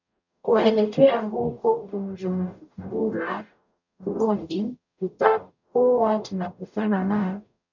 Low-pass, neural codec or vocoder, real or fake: 7.2 kHz; codec, 44.1 kHz, 0.9 kbps, DAC; fake